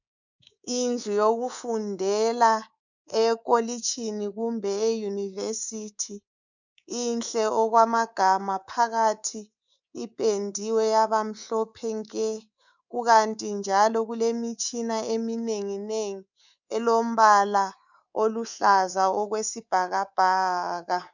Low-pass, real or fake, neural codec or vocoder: 7.2 kHz; fake; codec, 24 kHz, 3.1 kbps, DualCodec